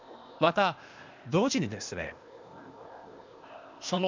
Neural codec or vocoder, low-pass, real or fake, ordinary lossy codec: codec, 16 kHz, 0.8 kbps, ZipCodec; 7.2 kHz; fake; MP3, 64 kbps